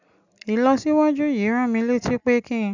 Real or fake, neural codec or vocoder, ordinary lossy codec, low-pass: real; none; none; 7.2 kHz